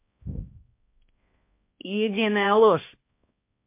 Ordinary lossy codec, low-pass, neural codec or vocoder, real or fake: MP3, 24 kbps; 3.6 kHz; codec, 16 kHz, 1 kbps, X-Codec, HuBERT features, trained on balanced general audio; fake